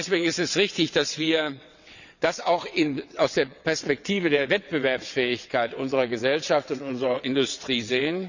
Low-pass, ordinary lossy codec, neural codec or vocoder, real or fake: 7.2 kHz; none; vocoder, 22.05 kHz, 80 mel bands, WaveNeXt; fake